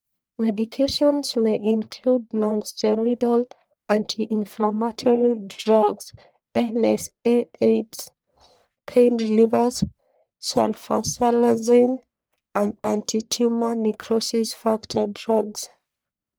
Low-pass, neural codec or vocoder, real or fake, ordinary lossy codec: none; codec, 44.1 kHz, 1.7 kbps, Pupu-Codec; fake; none